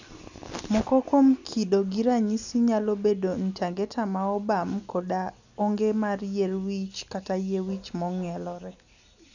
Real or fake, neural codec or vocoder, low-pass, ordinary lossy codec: real; none; 7.2 kHz; none